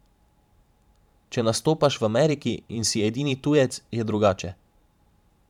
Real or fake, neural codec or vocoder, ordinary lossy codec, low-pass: fake; vocoder, 44.1 kHz, 128 mel bands every 512 samples, BigVGAN v2; none; 19.8 kHz